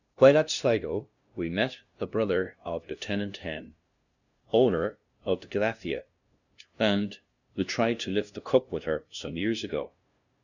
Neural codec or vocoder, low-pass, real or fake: codec, 16 kHz, 0.5 kbps, FunCodec, trained on LibriTTS, 25 frames a second; 7.2 kHz; fake